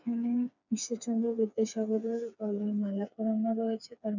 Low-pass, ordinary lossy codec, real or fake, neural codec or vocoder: 7.2 kHz; none; fake; codec, 16 kHz, 4 kbps, FreqCodec, smaller model